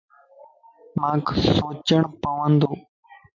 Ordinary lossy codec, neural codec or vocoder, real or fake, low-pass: MP3, 48 kbps; none; real; 7.2 kHz